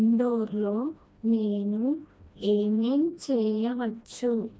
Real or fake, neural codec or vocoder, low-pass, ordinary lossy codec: fake; codec, 16 kHz, 1 kbps, FreqCodec, smaller model; none; none